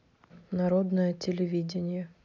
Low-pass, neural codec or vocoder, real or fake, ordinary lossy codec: 7.2 kHz; none; real; none